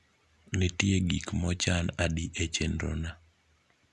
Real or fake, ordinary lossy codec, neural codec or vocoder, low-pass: real; none; none; none